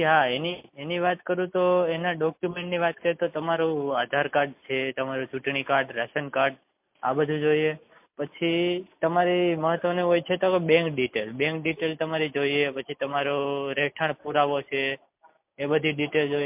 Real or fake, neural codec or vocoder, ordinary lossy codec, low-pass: real; none; MP3, 32 kbps; 3.6 kHz